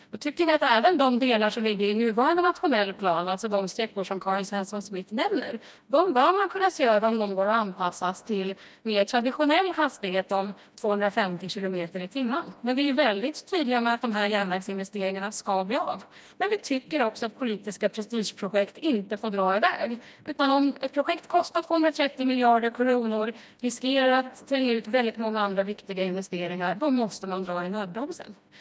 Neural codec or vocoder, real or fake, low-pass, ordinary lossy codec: codec, 16 kHz, 1 kbps, FreqCodec, smaller model; fake; none; none